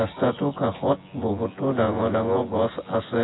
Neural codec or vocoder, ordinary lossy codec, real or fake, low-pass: vocoder, 24 kHz, 100 mel bands, Vocos; AAC, 16 kbps; fake; 7.2 kHz